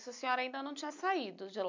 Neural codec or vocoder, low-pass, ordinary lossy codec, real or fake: none; 7.2 kHz; MP3, 64 kbps; real